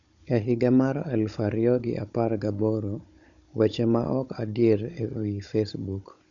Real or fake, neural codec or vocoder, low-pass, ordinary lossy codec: fake; codec, 16 kHz, 16 kbps, FunCodec, trained on Chinese and English, 50 frames a second; 7.2 kHz; none